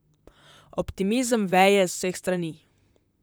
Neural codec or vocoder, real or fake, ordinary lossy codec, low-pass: vocoder, 44.1 kHz, 128 mel bands, Pupu-Vocoder; fake; none; none